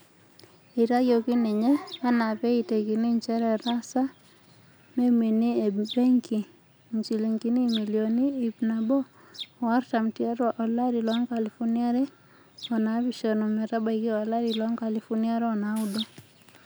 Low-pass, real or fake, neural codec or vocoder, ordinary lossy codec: none; real; none; none